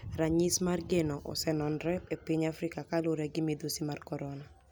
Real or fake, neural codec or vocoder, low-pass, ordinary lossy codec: real; none; none; none